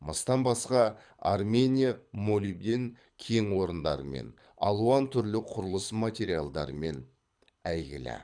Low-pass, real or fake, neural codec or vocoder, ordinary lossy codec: 9.9 kHz; fake; codec, 24 kHz, 6 kbps, HILCodec; none